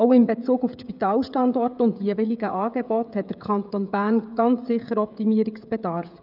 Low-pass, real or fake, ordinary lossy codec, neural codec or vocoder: 5.4 kHz; fake; none; codec, 16 kHz, 16 kbps, FreqCodec, smaller model